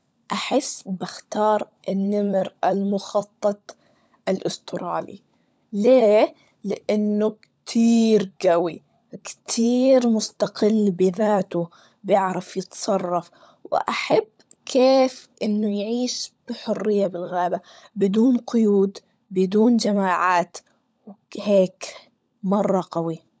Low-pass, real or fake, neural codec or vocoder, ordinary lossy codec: none; fake; codec, 16 kHz, 16 kbps, FunCodec, trained on LibriTTS, 50 frames a second; none